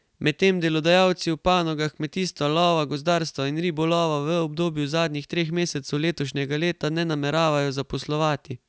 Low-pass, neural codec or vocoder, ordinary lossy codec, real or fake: none; none; none; real